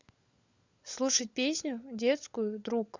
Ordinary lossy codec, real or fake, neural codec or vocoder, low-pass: Opus, 64 kbps; fake; codec, 16 kHz, 16 kbps, FunCodec, trained on LibriTTS, 50 frames a second; 7.2 kHz